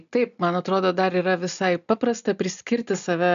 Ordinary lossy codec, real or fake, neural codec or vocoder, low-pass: AAC, 96 kbps; real; none; 7.2 kHz